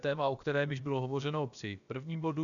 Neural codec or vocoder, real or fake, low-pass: codec, 16 kHz, about 1 kbps, DyCAST, with the encoder's durations; fake; 7.2 kHz